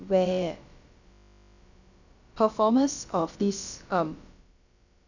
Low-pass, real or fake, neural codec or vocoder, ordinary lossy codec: 7.2 kHz; fake; codec, 16 kHz, about 1 kbps, DyCAST, with the encoder's durations; none